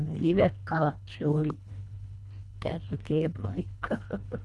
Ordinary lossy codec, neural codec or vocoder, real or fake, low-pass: none; codec, 24 kHz, 1.5 kbps, HILCodec; fake; none